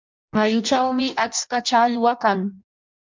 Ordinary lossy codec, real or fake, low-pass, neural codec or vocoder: MP3, 64 kbps; fake; 7.2 kHz; codec, 16 kHz in and 24 kHz out, 0.6 kbps, FireRedTTS-2 codec